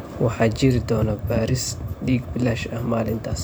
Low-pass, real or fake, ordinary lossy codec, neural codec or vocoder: none; fake; none; vocoder, 44.1 kHz, 128 mel bands every 512 samples, BigVGAN v2